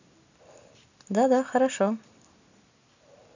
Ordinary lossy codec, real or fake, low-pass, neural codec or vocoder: none; real; 7.2 kHz; none